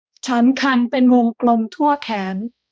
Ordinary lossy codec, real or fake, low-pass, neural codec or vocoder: none; fake; none; codec, 16 kHz, 1 kbps, X-Codec, HuBERT features, trained on balanced general audio